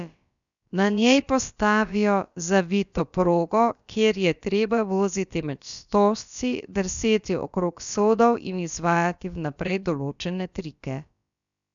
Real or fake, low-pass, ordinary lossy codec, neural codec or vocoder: fake; 7.2 kHz; none; codec, 16 kHz, about 1 kbps, DyCAST, with the encoder's durations